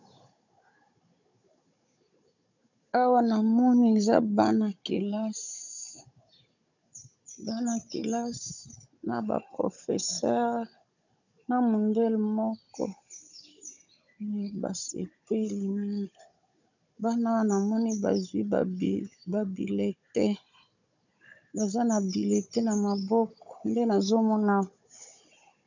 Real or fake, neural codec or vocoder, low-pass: fake; codec, 16 kHz, 16 kbps, FunCodec, trained on Chinese and English, 50 frames a second; 7.2 kHz